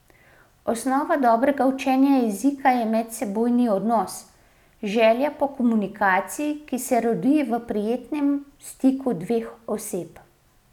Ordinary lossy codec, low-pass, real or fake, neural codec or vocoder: none; 19.8 kHz; real; none